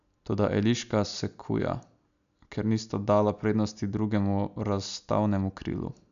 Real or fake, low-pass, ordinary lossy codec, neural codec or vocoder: real; 7.2 kHz; none; none